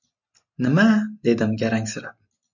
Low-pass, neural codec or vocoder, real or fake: 7.2 kHz; none; real